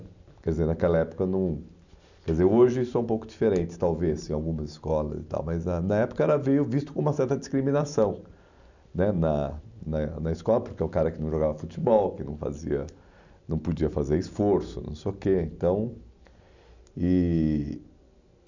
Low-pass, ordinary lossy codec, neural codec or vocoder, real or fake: 7.2 kHz; none; none; real